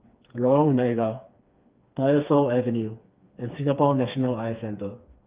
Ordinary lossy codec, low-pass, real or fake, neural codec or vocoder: Opus, 32 kbps; 3.6 kHz; fake; codec, 16 kHz, 4 kbps, FreqCodec, smaller model